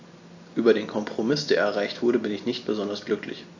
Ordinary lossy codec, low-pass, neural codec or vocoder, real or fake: AAC, 48 kbps; 7.2 kHz; none; real